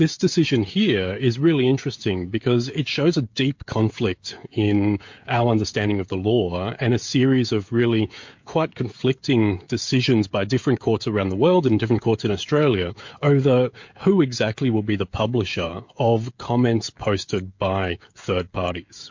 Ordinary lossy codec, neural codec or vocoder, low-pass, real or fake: MP3, 48 kbps; codec, 16 kHz, 16 kbps, FreqCodec, smaller model; 7.2 kHz; fake